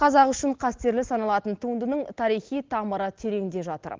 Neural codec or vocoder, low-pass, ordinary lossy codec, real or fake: none; 7.2 kHz; Opus, 16 kbps; real